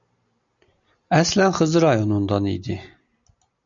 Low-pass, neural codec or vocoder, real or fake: 7.2 kHz; none; real